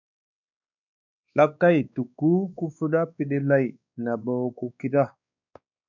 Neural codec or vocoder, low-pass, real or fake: codec, 16 kHz, 2 kbps, X-Codec, WavLM features, trained on Multilingual LibriSpeech; 7.2 kHz; fake